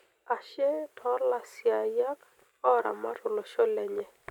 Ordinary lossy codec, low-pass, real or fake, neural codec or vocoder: none; 19.8 kHz; real; none